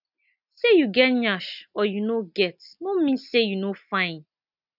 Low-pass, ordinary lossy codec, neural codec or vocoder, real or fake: 5.4 kHz; none; none; real